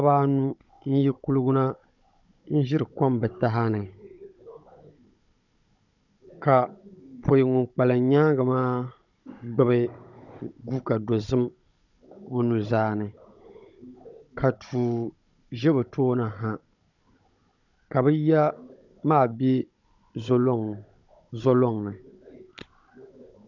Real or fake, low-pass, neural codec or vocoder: fake; 7.2 kHz; codec, 16 kHz, 16 kbps, FunCodec, trained on Chinese and English, 50 frames a second